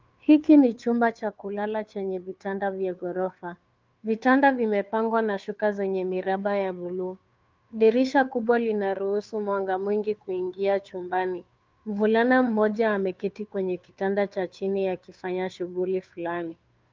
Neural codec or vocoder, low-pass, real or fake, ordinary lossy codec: codec, 16 kHz, 2 kbps, FunCodec, trained on Chinese and English, 25 frames a second; 7.2 kHz; fake; Opus, 24 kbps